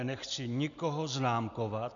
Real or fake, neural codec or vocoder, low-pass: real; none; 7.2 kHz